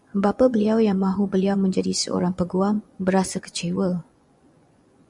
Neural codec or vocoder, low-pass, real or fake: vocoder, 24 kHz, 100 mel bands, Vocos; 10.8 kHz; fake